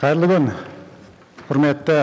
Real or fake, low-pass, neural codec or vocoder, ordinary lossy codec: real; none; none; none